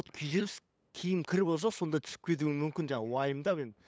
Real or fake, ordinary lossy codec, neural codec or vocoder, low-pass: fake; none; codec, 16 kHz, 8 kbps, FunCodec, trained on LibriTTS, 25 frames a second; none